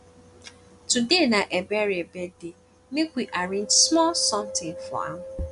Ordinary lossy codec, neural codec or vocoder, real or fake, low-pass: none; none; real; 10.8 kHz